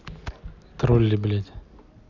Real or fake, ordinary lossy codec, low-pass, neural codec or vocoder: real; none; 7.2 kHz; none